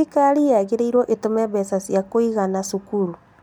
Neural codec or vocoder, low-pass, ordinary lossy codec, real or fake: none; 19.8 kHz; none; real